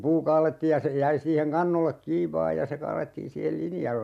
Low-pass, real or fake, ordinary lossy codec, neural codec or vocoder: 14.4 kHz; real; MP3, 96 kbps; none